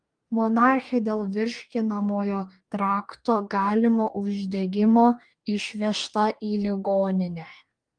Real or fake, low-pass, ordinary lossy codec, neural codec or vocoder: fake; 9.9 kHz; Opus, 32 kbps; codec, 44.1 kHz, 2.6 kbps, DAC